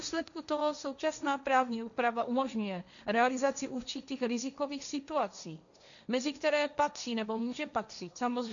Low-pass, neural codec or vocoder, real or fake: 7.2 kHz; codec, 16 kHz, 1.1 kbps, Voila-Tokenizer; fake